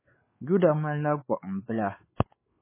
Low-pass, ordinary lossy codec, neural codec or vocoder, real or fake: 3.6 kHz; MP3, 16 kbps; codec, 16 kHz, 4 kbps, X-Codec, WavLM features, trained on Multilingual LibriSpeech; fake